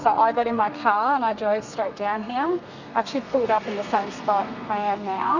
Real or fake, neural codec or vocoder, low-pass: fake; codec, 32 kHz, 1.9 kbps, SNAC; 7.2 kHz